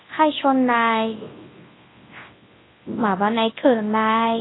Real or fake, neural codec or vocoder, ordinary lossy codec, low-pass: fake; codec, 24 kHz, 0.9 kbps, WavTokenizer, large speech release; AAC, 16 kbps; 7.2 kHz